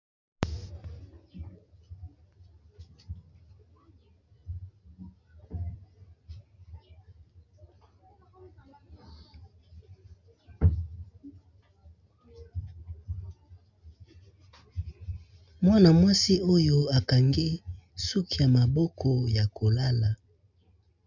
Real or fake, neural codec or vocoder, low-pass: real; none; 7.2 kHz